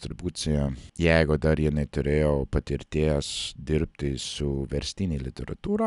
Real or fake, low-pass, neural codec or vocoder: real; 10.8 kHz; none